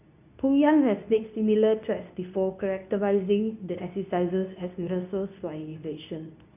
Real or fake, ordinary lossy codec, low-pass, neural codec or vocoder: fake; none; 3.6 kHz; codec, 24 kHz, 0.9 kbps, WavTokenizer, medium speech release version 2